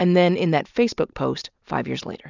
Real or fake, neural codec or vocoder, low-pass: real; none; 7.2 kHz